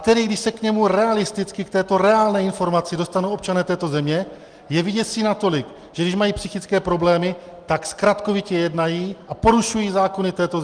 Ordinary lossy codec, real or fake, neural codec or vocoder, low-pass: Opus, 24 kbps; real; none; 9.9 kHz